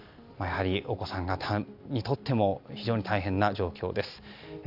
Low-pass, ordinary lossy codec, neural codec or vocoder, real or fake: 5.4 kHz; AAC, 48 kbps; none; real